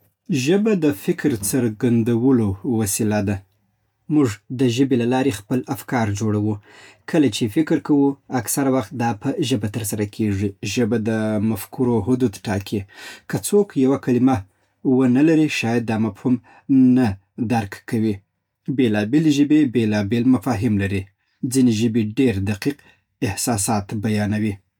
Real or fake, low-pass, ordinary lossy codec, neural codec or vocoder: real; 19.8 kHz; none; none